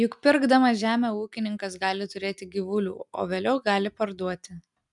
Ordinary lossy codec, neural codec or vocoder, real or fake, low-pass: MP3, 96 kbps; none; real; 10.8 kHz